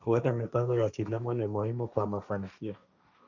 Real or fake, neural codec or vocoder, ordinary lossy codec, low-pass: fake; codec, 16 kHz, 1.1 kbps, Voila-Tokenizer; none; 7.2 kHz